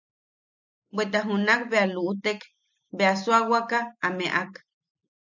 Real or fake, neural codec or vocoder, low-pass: real; none; 7.2 kHz